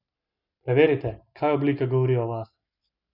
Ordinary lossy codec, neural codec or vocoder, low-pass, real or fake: none; none; 5.4 kHz; real